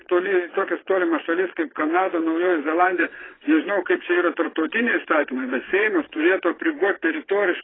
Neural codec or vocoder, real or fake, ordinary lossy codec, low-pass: vocoder, 22.05 kHz, 80 mel bands, WaveNeXt; fake; AAC, 16 kbps; 7.2 kHz